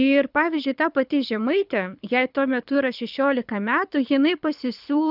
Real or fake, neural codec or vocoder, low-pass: fake; codec, 24 kHz, 6 kbps, HILCodec; 5.4 kHz